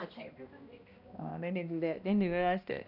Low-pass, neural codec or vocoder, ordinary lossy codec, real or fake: 5.4 kHz; codec, 16 kHz, 1 kbps, X-Codec, HuBERT features, trained on balanced general audio; none; fake